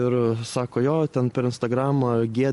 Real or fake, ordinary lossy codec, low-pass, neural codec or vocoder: real; MP3, 48 kbps; 14.4 kHz; none